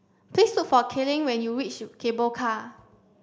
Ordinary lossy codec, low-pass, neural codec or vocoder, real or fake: none; none; none; real